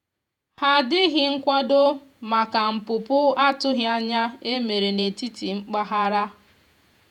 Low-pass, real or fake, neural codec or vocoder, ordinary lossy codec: 19.8 kHz; fake; vocoder, 48 kHz, 128 mel bands, Vocos; none